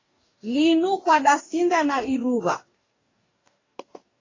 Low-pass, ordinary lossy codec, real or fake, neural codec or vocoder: 7.2 kHz; AAC, 32 kbps; fake; codec, 44.1 kHz, 2.6 kbps, DAC